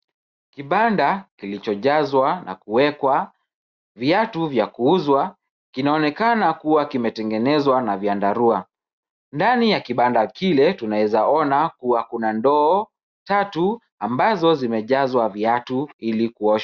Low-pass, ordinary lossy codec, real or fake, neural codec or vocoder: 7.2 kHz; Opus, 64 kbps; real; none